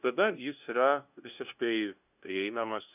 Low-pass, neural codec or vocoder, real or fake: 3.6 kHz; codec, 16 kHz, 0.5 kbps, FunCodec, trained on LibriTTS, 25 frames a second; fake